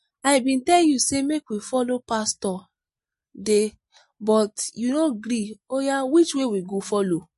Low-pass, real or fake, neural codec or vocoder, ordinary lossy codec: 14.4 kHz; real; none; MP3, 48 kbps